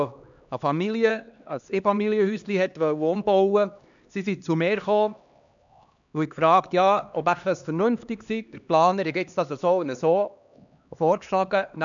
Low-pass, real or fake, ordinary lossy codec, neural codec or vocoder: 7.2 kHz; fake; none; codec, 16 kHz, 2 kbps, X-Codec, HuBERT features, trained on LibriSpeech